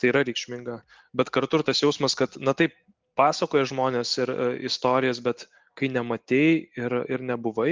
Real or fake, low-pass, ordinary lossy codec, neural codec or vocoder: real; 7.2 kHz; Opus, 32 kbps; none